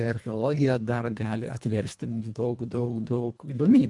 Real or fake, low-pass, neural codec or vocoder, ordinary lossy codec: fake; 10.8 kHz; codec, 24 kHz, 1.5 kbps, HILCodec; MP3, 64 kbps